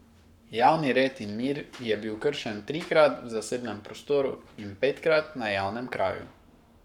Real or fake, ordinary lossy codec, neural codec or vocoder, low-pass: fake; none; codec, 44.1 kHz, 7.8 kbps, Pupu-Codec; 19.8 kHz